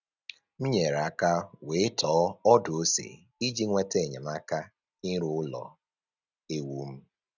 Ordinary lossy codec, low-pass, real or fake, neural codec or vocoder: none; 7.2 kHz; real; none